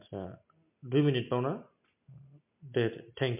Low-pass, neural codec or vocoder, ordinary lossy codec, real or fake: 3.6 kHz; none; MP3, 32 kbps; real